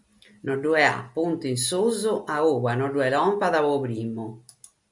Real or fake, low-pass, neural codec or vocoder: real; 10.8 kHz; none